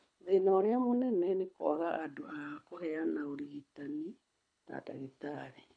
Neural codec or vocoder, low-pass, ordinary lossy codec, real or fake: codec, 24 kHz, 6 kbps, HILCodec; 9.9 kHz; none; fake